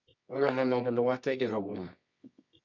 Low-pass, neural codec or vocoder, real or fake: 7.2 kHz; codec, 24 kHz, 0.9 kbps, WavTokenizer, medium music audio release; fake